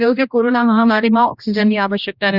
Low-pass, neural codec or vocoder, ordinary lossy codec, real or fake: 5.4 kHz; codec, 16 kHz, 1 kbps, X-Codec, HuBERT features, trained on general audio; none; fake